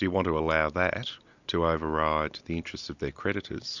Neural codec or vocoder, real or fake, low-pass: none; real; 7.2 kHz